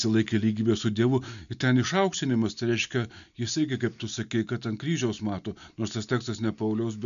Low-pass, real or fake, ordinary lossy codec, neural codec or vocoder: 7.2 kHz; real; MP3, 96 kbps; none